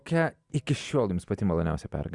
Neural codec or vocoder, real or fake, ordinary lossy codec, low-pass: none; real; Opus, 64 kbps; 10.8 kHz